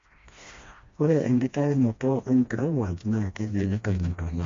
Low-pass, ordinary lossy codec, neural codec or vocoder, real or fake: 7.2 kHz; MP3, 48 kbps; codec, 16 kHz, 1 kbps, FreqCodec, smaller model; fake